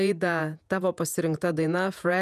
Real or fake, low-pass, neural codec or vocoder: fake; 14.4 kHz; vocoder, 48 kHz, 128 mel bands, Vocos